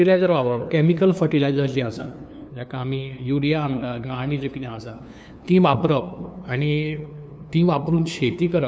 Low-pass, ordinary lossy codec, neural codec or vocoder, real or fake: none; none; codec, 16 kHz, 2 kbps, FunCodec, trained on LibriTTS, 25 frames a second; fake